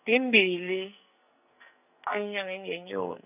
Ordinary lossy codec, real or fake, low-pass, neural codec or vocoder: none; fake; 3.6 kHz; codec, 44.1 kHz, 2.6 kbps, SNAC